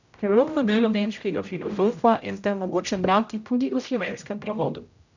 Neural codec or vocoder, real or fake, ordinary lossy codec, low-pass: codec, 16 kHz, 0.5 kbps, X-Codec, HuBERT features, trained on general audio; fake; none; 7.2 kHz